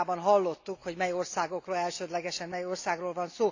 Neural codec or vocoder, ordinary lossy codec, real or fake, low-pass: none; AAC, 48 kbps; real; 7.2 kHz